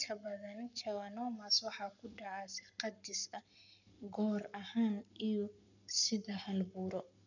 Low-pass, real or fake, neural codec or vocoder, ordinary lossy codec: 7.2 kHz; fake; codec, 44.1 kHz, 7.8 kbps, Pupu-Codec; none